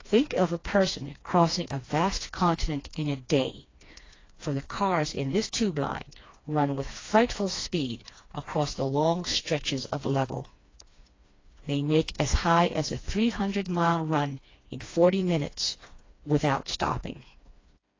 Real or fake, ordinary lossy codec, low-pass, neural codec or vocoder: fake; AAC, 32 kbps; 7.2 kHz; codec, 16 kHz, 2 kbps, FreqCodec, smaller model